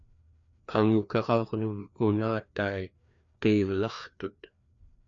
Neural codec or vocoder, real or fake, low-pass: codec, 16 kHz, 2 kbps, FreqCodec, larger model; fake; 7.2 kHz